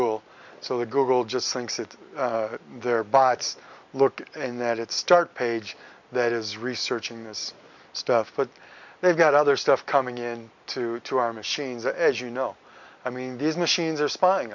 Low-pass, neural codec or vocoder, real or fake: 7.2 kHz; none; real